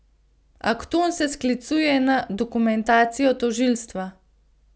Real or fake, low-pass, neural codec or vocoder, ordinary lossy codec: real; none; none; none